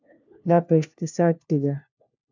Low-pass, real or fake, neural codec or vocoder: 7.2 kHz; fake; codec, 16 kHz, 1 kbps, FunCodec, trained on LibriTTS, 50 frames a second